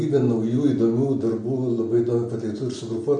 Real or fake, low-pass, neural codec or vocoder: real; 10.8 kHz; none